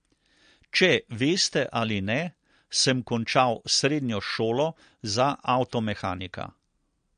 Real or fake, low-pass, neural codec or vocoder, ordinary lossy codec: real; 9.9 kHz; none; MP3, 48 kbps